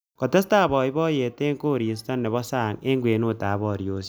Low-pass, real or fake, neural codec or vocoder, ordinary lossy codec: none; real; none; none